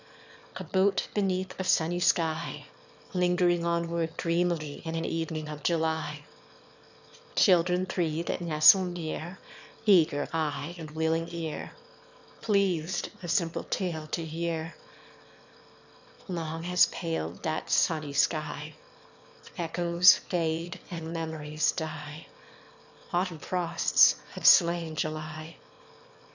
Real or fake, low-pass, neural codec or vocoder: fake; 7.2 kHz; autoencoder, 22.05 kHz, a latent of 192 numbers a frame, VITS, trained on one speaker